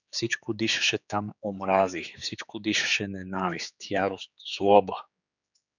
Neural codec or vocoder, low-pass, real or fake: codec, 16 kHz, 4 kbps, X-Codec, HuBERT features, trained on general audio; 7.2 kHz; fake